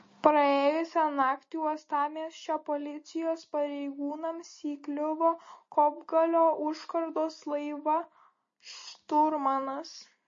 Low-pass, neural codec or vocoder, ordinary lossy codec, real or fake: 7.2 kHz; none; MP3, 32 kbps; real